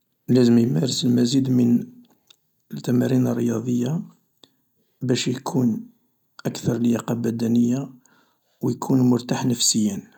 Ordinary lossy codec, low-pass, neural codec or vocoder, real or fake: none; 19.8 kHz; none; real